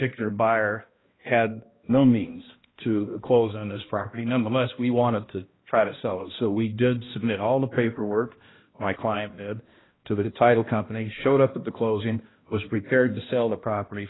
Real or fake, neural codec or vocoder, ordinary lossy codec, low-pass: fake; codec, 16 kHz, 1 kbps, X-Codec, HuBERT features, trained on balanced general audio; AAC, 16 kbps; 7.2 kHz